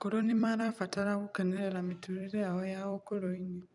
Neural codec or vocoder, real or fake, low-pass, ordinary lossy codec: vocoder, 44.1 kHz, 128 mel bands every 256 samples, BigVGAN v2; fake; 10.8 kHz; none